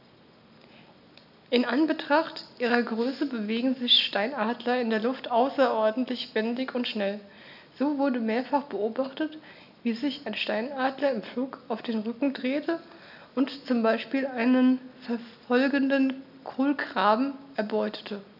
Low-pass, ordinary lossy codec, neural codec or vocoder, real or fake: 5.4 kHz; none; none; real